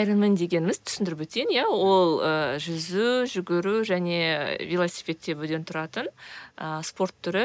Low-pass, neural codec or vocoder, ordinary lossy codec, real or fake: none; none; none; real